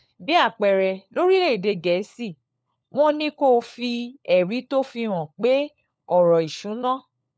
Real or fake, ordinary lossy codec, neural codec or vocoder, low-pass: fake; none; codec, 16 kHz, 4 kbps, FunCodec, trained on LibriTTS, 50 frames a second; none